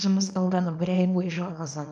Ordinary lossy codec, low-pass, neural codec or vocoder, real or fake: none; 9.9 kHz; codec, 24 kHz, 0.9 kbps, WavTokenizer, small release; fake